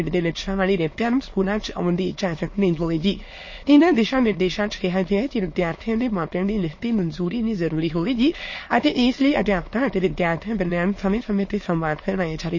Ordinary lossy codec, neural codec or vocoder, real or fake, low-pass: MP3, 32 kbps; autoencoder, 22.05 kHz, a latent of 192 numbers a frame, VITS, trained on many speakers; fake; 7.2 kHz